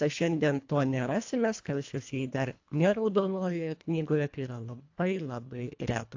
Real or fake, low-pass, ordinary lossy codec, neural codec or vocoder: fake; 7.2 kHz; AAC, 48 kbps; codec, 24 kHz, 1.5 kbps, HILCodec